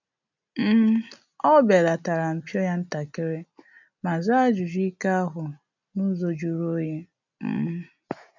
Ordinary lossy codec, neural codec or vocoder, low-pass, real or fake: none; none; 7.2 kHz; real